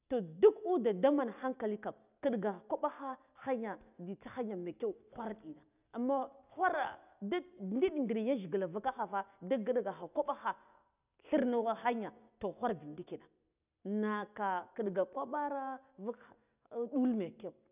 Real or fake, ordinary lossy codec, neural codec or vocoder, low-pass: real; none; none; 3.6 kHz